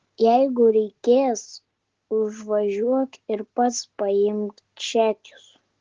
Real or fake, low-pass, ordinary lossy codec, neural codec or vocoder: real; 7.2 kHz; Opus, 16 kbps; none